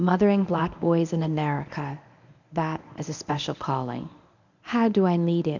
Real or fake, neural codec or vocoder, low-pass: fake; codec, 24 kHz, 0.9 kbps, WavTokenizer, medium speech release version 1; 7.2 kHz